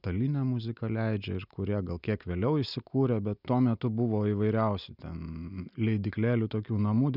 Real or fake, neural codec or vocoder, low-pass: real; none; 5.4 kHz